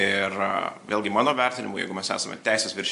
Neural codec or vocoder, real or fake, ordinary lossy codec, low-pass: none; real; AAC, 64 kbps; 10.8 kHz